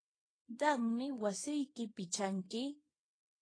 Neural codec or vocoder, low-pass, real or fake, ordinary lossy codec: codec, 24 kHz, 0.9 kbps, WavTokenizer, small release; 9.9 kHz; fake; AAC, 32 kbps